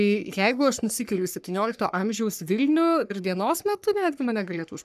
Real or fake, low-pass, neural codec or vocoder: fake; 14.4 kHz; codec, 44.1 kHz, 3.4 kbps, Pupu-Codec